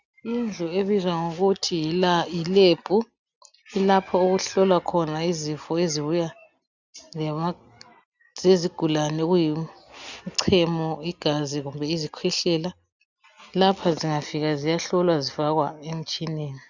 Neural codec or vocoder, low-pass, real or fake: none; 7.2 kHz; real